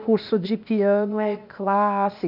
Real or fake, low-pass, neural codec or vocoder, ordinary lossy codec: fake; 5.4 kHz; codec, 16 kHz, 0.8 kbps, ZipCodec; none